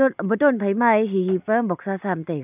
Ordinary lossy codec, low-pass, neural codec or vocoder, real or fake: none; 3.6 kHz; none; real